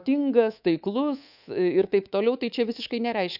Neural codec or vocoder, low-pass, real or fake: codec, 24 kHz, 3.1 kbps, DualCodec; 5.4 kHz; fake